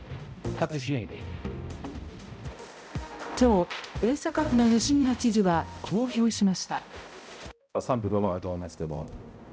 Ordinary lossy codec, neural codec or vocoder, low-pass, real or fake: none; codec, 16 kHz, 0.5 kbps, X-Codec, HuBERT features, trained on balanced general audio; none; fake